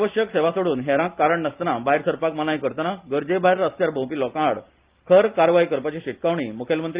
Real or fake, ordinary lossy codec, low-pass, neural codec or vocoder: real; Opus, 32 kbps; 3.6 kHz; none